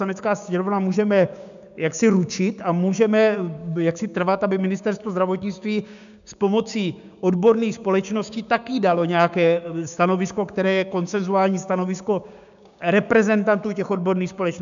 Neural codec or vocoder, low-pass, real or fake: codec, 16 kHz, 6 kbps, DAC; 7.2 kHz; fake